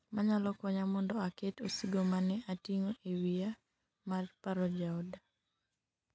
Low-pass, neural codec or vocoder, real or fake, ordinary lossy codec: none; none; real; none